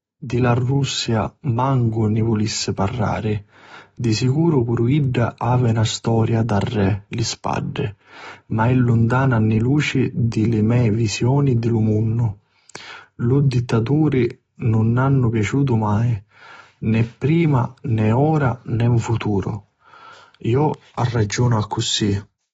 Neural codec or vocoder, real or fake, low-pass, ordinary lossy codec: none; real; 19.8 kHz; AAC, 24 kbps